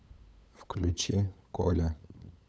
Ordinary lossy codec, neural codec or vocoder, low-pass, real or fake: none; codec, 16 kHz, 8 kbps, FunCodec, trained on LibriTTS, 25 frames a second; none; fake